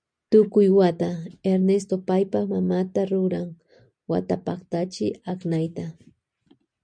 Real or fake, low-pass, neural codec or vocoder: real; 9.9 kHz; none